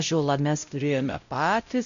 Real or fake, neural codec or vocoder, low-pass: fake; codec, 16 kHz, 0.5 kbps, X-Codec, WavLM features, trained on Multilingual LibriSpeech; 7.2 kHz